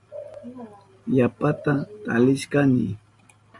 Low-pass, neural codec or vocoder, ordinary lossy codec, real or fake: 10.8 kHz; none; MP3, 96 kbps; real